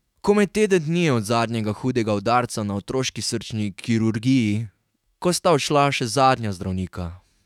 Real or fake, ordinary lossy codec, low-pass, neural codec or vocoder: fake; none; 19.8 kHz; autoencoder, 48 kHz, 128 numbers a frame, DAC-VAE, trained on Japanese speech